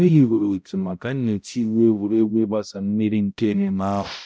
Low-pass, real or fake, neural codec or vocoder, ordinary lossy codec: none; fake; codec, 16 kHz, 0.5 kbps, X-Codec, HuBERT features, trained on balanced general audio; none